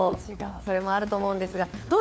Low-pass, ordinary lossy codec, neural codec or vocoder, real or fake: none; none; codec, 16 kHz, 4 kbps, FunCodec, trained on LibriTTS, 50 frames a second; fake